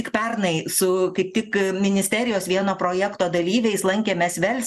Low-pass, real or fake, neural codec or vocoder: 14.4 kHz; real; none